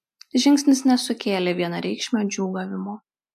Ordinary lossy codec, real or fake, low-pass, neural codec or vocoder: AAC, 96 kbps; real; 14.4 kHz; none